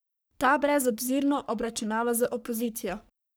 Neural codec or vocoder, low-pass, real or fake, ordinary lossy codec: codec, 44.1 kHz, 3.4 kbps, Pupu-Codec; none; fake; none